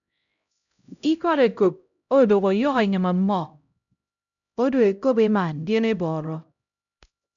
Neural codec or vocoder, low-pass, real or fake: codec, 16 kHz, 0.5 kbps, X-Codec, HuBERT features, trained on LibriSpeech; 7.2 kHz; fake